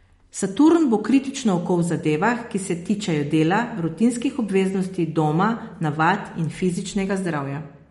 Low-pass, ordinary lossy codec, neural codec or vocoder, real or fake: 14.4 kHz; MP3, 48 kbps; none; real